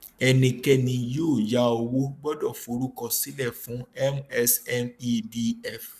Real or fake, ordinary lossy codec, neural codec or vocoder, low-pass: fake; none; codec, 44.1 kHz, 7.8 kbps, Pupu-Codec; 14.4 kHz